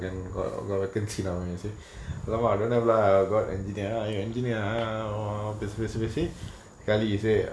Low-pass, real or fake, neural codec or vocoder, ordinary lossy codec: none; real; none; none